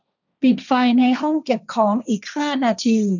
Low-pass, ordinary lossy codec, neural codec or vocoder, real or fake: 7.2 kHz; none; codec, 16 kHz, 1.1 kbps, Voila-Tokenizer; fake